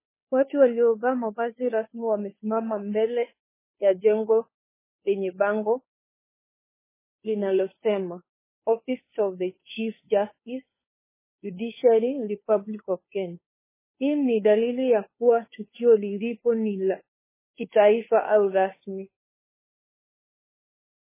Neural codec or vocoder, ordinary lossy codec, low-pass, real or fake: codec, 16 kHz, 2 kbps, FunCodec, trained on Chinese and English, 25 frames a second; MP3, 16 kbps; 3.6 kHz; fake